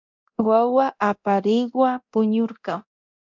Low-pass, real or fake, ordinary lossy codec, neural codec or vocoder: 7.2 kHz; fake; MP3, 64 kbps; codec, 24 kHz, 0.9 kbps, DualCodec